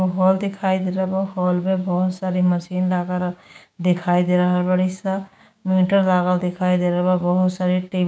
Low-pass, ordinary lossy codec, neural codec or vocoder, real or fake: none; none; codec, 16 kHz, 6 kbps, DAC; fake